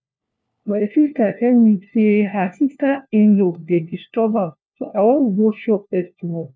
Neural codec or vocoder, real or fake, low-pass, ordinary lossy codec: codec, 16 kHz, 1 kbps, FunCodec, trained on LibriTTS, 50 frames a second; fake; none; none